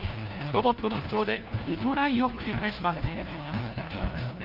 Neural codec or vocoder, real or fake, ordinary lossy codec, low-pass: codec, 16 kHz, 1 kbps, FunCodec, trained on LibriTTS, 50 frames a second; fake; Opus, 24 kbps; 5.4 kHz